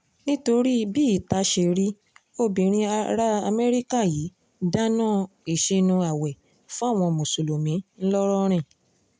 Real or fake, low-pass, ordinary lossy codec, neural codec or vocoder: real; none; none; none